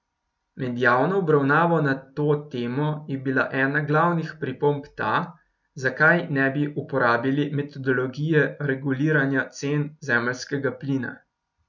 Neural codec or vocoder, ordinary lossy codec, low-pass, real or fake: none; none; 7.2 kHz; real